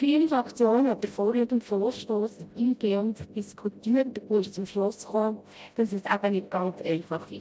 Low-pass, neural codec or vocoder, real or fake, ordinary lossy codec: none; codec, 16 kHz, 0.5 kbps, FreqCodec, smaller model; fake; none